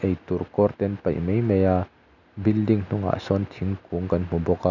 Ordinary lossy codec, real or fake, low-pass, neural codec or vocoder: none; real; 7.2 kHz; none